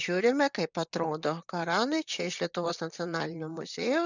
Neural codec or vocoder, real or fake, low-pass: vocoder, 44.1 kHz, 128 mel bands, Pupu-Vocoder; fake; 7.2 kHz